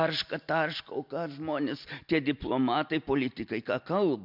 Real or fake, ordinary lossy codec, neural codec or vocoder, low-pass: real; MP3, 48 kbps; none; 5.4 kHz